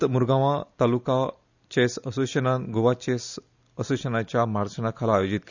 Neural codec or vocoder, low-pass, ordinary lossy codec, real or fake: none; 7.2 kHz; none; real